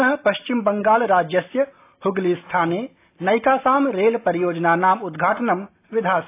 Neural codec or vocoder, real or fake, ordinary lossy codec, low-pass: none; real; AAC, 24 kbps; 3.6 kHz